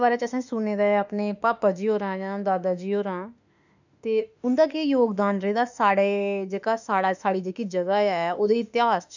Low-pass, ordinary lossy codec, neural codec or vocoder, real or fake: 7.2 kHz; none; autoencoder, 48 kHz, 32 numbers a frame, DAC-VAE, trained on Japanese speech; fake